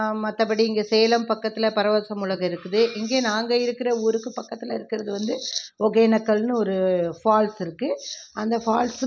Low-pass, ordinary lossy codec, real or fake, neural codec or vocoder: none; none; real; none